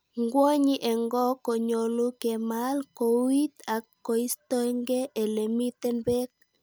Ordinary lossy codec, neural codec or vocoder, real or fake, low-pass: none; none; real; none